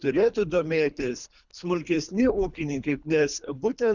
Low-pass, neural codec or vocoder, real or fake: 7.2 kHz; codec, 24 kHz, 3 kbps, HILCodec; fake